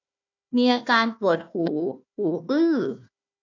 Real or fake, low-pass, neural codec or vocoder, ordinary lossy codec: fake; 7.2 kHz; codec, 16 kHz, 1 kbps, FunCodec, trained on Chinese and English, 50 frames a second; none